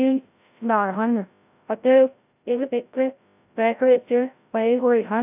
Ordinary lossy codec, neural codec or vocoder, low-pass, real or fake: AAC, 32 kbps; codec, 16 kHz, 0.5 kbps, FreqCodec, larger model; 3.6 kHz; fake